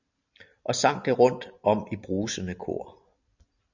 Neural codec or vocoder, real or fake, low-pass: none; real; 7.2 kHz